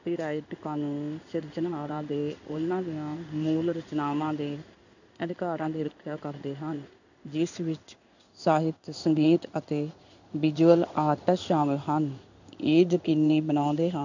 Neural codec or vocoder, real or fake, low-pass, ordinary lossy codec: codec, 16 kHz in and 24 kHz out, 1 kbps, XY-Tokenizer; fake; 7.2 kHz; none